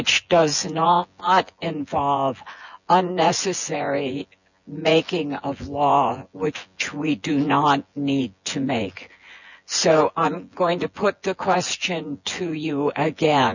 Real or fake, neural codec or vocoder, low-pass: fake; vocoder, 24 kHz, 100 mel bands, Vocos; 7.2 kHz